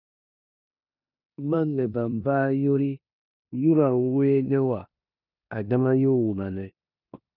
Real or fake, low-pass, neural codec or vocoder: fake; 5.4 kHz; codec, 16 kHz in and 24 kHz out, 0.9 kbps, LongCat-Audio-Codec, four codebook decoder